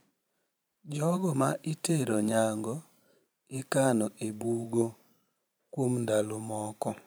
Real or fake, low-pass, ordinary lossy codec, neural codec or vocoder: fake; none; none; vocoder, 44.1 kHz, 128 mel bands every 512 samples, BigVGAN v2